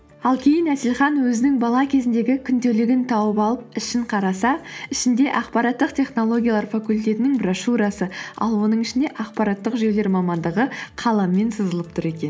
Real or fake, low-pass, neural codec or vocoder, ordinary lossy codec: real; none; none; none